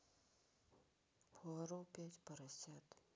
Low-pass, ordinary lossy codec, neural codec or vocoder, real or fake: none; none; none; real